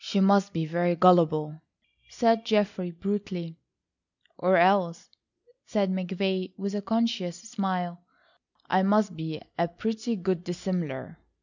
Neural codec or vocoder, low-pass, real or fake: none; 7.2 kHz; real